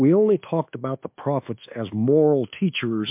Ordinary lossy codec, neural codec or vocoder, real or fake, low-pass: MP3, 32 kbps; codec, 24 kHz, 1.2 kbps, DualCodec; fake; 3.6 kHz